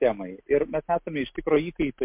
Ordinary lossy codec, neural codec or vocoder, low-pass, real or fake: MP3, 32 kbps; none; 3.6 kHz; real